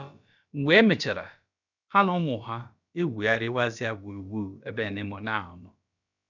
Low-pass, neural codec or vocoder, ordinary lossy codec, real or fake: 7.2 kHz; codec, 16 kHz, about 1 kbps, DyCAST, with the encoder's durations; none; fake